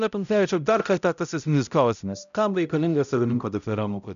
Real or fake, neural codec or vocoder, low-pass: fake; codec, 16 kHz, 0.5 kbps, X-Codec, HuBERT features, trained on balanced general audio; 7.2 kHz